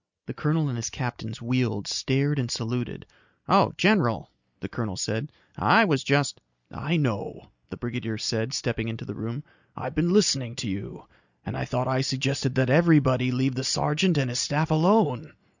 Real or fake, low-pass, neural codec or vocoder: real; 7.2 kHz; none